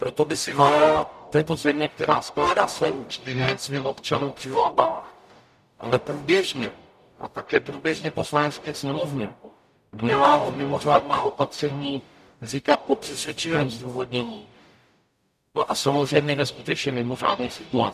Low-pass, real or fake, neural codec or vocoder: 14.4 kHz; fake; codec, 44.1 kHz, 0.9 kbps, DAC